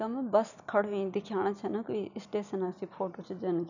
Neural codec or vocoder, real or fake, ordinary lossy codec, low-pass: none; real; none; 7.2 kHz